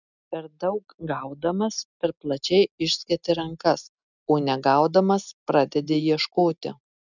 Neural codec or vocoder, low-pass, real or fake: none; 7.2 kHz; real